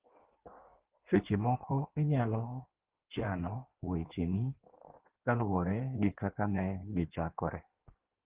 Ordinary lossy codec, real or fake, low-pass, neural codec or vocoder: Opus, 16 kbps; fake; 3.6 kHz; codec, 16 kHz in and 24 kHz out, 1.1 kbps, FireRedTTS-2 codec